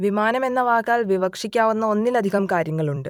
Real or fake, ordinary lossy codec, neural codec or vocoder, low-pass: fake; none; vocoder, 44.1 kHz, 128 mel bands every 256 samples, BigVGAN v2; 19.8 kHz